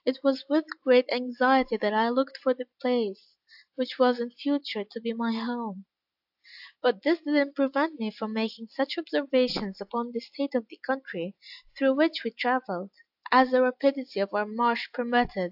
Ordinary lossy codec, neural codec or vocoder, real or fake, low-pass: AAC, 48 kbps; none; real; 5.4 kHz